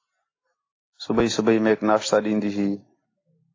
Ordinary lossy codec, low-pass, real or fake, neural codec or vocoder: AAC, 32 kbps; 7.2 kHz; real; none